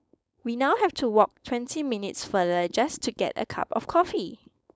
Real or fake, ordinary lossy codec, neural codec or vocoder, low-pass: fake; none; codec, 16 kHz, 4.8 kbps, FACodec; none